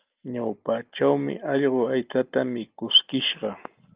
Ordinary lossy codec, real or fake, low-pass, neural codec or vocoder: Opus, 24 kbps; real; 3.6 kHz; none